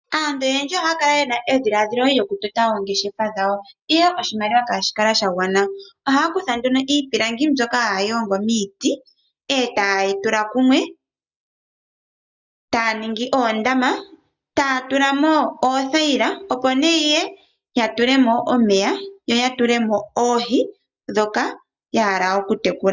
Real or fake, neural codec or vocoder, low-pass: real; none; 7.2 kHz